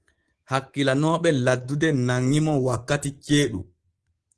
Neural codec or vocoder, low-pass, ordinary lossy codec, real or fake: codec, 24 kHz, 3.1 kbps, DualCodec; 10.8 kHz; Opus, 16 kbps; fake